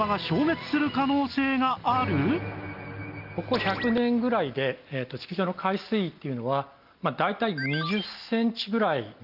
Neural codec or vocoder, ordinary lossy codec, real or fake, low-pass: none; Opus, 24 kbps; real; 5.4 kHz